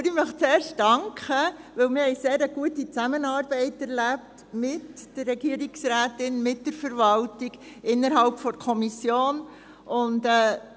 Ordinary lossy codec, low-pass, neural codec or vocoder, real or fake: none; none; none; real